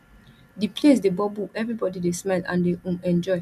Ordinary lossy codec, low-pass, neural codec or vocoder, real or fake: none; 14.4 kHz; none; real